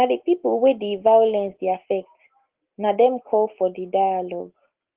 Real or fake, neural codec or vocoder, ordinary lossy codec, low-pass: real; none; Opus, 16 kbps; 3.6 kHz